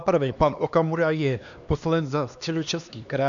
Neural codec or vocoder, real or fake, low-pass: codec, 16 kHz, 2 kbps, X-Codec, HuBERT features, trained on LibriSpeech; fake; 7.2 kHz